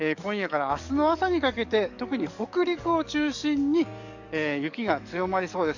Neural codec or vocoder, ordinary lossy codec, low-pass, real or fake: codec, 44.1 kHz, 7.8 kbps, DAC; none; 7.2 kHz; fake